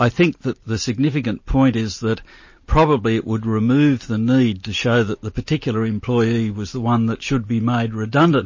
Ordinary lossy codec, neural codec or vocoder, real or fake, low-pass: MP3, 32 kbps; none; real; 7.2 kHz